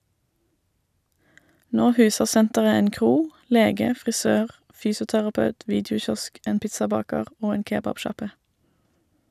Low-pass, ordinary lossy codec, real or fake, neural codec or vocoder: 14.4 kHz; none; real; none